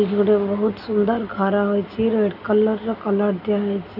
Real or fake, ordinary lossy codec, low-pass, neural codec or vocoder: real; none; 5.4 kHz; none